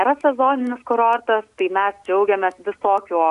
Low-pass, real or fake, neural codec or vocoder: 10.8 kHz; real; none